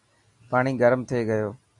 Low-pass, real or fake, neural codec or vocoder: 10.8 kHz; real; none